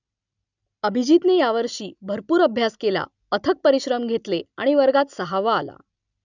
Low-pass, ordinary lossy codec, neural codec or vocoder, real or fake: 7.2 kHz; none; none; real